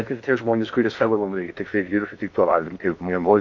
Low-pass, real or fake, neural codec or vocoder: 7.2 kHz; fake; codec, 16 kHz in and 24 kHz out, 0.6 kbps, FocalCodec, streaming, 2048 codes